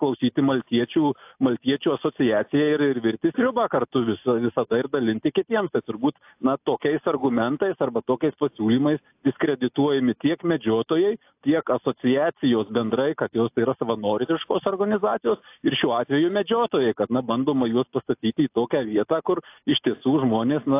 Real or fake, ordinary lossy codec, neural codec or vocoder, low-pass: real; AAC, 32 kbps; none; 3.6 kHz